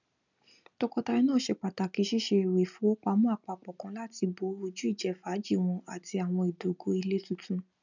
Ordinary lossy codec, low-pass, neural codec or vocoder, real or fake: none; 7.2 kHz; none; real